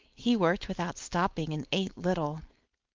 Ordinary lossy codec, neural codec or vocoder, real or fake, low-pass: Opus, 32 kbps; codec, 16 kHz, 4.8 kbps, FACodec; fake; 7.2 kHz